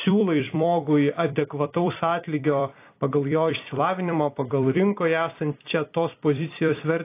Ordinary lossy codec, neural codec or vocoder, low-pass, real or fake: AAC, 24 kbps; vocoder, 44.1 kHz, 128 mel bands every 256 samples, BigVGAN v2; 3.6 kHz; fake